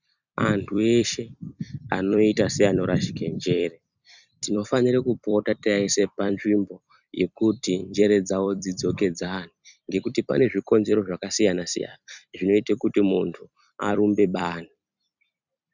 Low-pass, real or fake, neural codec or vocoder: 7.2 kHz; fake; vocoder, 44.1 kHz, 128 mel bands every 256 samples, BigVGAN v2